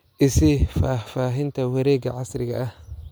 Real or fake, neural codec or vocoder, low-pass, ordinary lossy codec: real; none; none; none